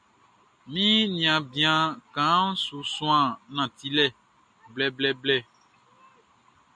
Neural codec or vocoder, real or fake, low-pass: none; real; 9.9 kHz